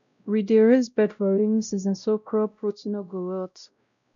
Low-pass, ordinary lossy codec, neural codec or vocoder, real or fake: 7.2 kHz; none; codec, 16 kHz, 0.5 kbps, X-Codec, WavLM features, trained on Multilingual LibriSpeech; fake